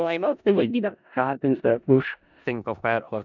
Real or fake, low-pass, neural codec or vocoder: fake; 7.2 kHz; codec, 16 kHz in and 24 kHz out, 0.4 kbps, LongCat-Audio-Codec, four codebook decoder